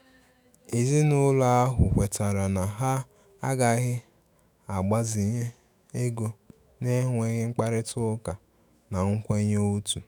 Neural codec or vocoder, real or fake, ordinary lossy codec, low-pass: autoencoder, 48 kHz, 128 numbers a frame, DAC-VAE, trained on Japanese speech; fake; none; none